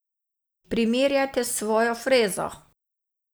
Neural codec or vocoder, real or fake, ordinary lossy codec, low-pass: none; real; none; none